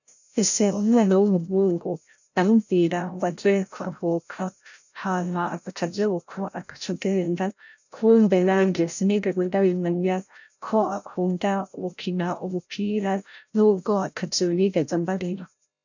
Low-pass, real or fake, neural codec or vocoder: 7.2 kHz; fake; codec, 16 kHz, 0.5 kbps, FreqCodec, larger model